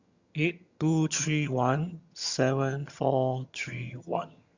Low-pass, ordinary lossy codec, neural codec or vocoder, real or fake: 7.2 kHz; Opus, 64 kbps; vocoder, 22.05 kHz, 80 mel bands, HiFi-GAN; fake